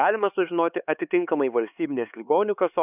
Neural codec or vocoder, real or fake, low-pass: codec, 16 kHz, 4 kbps, X-Codec, HuBERT features, trained on LibriSpeech; fake; 3.6 kHz